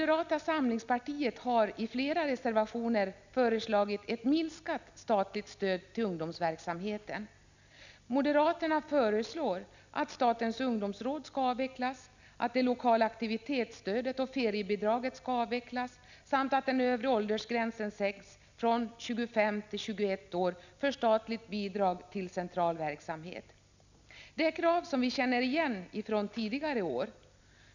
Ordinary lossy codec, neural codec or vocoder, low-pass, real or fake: none; none; 7.2 kHz; real